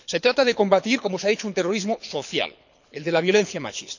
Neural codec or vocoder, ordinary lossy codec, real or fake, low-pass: codec, 24 kHz, 6 kbps, HILCodec; none; fake; 7.2 kHz